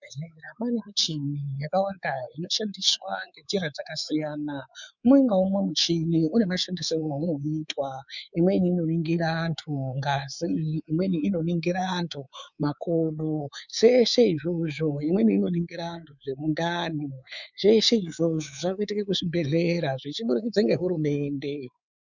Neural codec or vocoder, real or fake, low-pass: codec, 24 kHz, 3.1 kbps, DualCodec; fake; 7.2 kHz